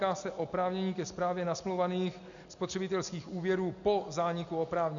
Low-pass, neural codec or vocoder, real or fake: 7.2 kHz; none; real